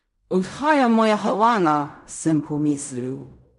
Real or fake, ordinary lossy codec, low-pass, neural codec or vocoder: fake; none; 10.8 kHz; codec, 16 kHz in and 24 kHz out, 0.4 kbps, LongCat-Audio-Codec, fine tuned four codebook decoder